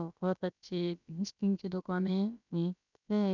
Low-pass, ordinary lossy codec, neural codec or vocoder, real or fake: 7.2 kHz; none; codec, 16 kHz, about 1 kbps, DyCAST, with the encoder's durations; fake